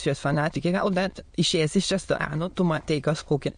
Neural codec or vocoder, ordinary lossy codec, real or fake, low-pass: autoencoder, 22.05 kHz, a latent of 192 numbers a frame, VITS, trained on many speakers; MP3, 48 kbps; fake; 9.9 kHz